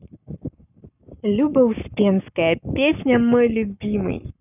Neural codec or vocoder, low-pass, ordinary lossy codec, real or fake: codec, 44.1 kHz, 7.8 kbps, Pupu-Codec; 3.6 kHz; none; fake